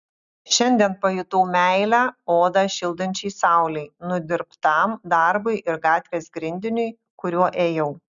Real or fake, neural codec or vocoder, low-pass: real; none; 7.2 kHz